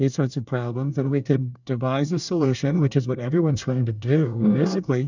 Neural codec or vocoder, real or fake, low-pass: codec, 24 kHz, 1 kbps, SNAC; fake; 7.2 kHz